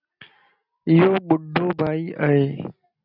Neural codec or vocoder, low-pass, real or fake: none; 5.4 kHz; real